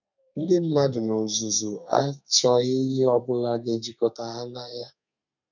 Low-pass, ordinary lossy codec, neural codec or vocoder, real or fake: 7.2 kHz; none; codec, 32 kHz, 1.9 kbps, SNAC; fake